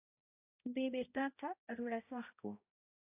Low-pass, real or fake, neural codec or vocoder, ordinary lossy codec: 3.6 kHz; fake; codec, 16 kHz, 0.5 kbps, X-Codec, HuBERT features, trained on balanced general audio; AAC, 32 kbps